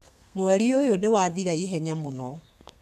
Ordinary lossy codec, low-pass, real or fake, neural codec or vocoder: none; 14.4 kHz; fake; codec, 32 kHz, 1.9 kbps, SNAC